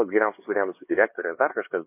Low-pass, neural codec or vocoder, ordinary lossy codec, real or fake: 3.6 kHz; codec, 16 kHz, 2 kbps, X-Codec, HuBERT features, trained on LibriSpeech; MP3, 24 kbps; fake